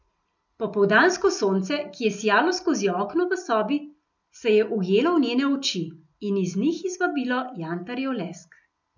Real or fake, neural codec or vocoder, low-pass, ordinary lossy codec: real; none; 7.2 kHz; none